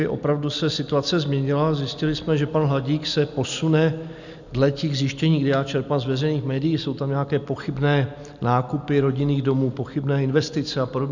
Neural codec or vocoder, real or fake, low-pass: none; real; 7.2 kHz